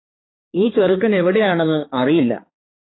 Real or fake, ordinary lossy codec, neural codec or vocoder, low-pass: fake; AAC, 16 kbps; codec, 16 kHz, 4 kbps, X-Codec, HuBERT features, trained on balanced general audio; 7.2 kHz